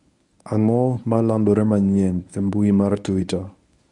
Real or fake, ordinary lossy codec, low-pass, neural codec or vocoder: fake; none; 10.8 kHz; codec, 24 kHz, 0.9 kbps, WavTokenizer, medium speech release version 1